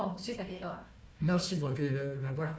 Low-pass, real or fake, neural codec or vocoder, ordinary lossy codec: none; fake; codec, 16 kHz, 1 kbps, FunCodec, trained on Chinese and English, 50 frames a second; none